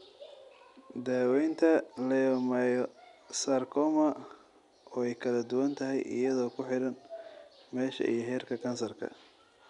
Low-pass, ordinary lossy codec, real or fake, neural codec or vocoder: 10.8 kHz; none; real; none